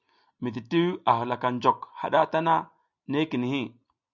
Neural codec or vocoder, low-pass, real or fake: none; 7.2 kHz; real